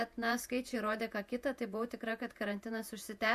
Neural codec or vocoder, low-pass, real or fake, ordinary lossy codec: vocoder, 48 kHz, 128 mel bands, Vocos; 14.4 kHz; fake; MP3, 64 kbps